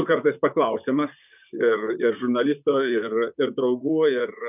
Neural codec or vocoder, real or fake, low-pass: vocoder, 44.1 kHz, 128 mel bands, Pupu-Vocoder; fake; 3.6 kHz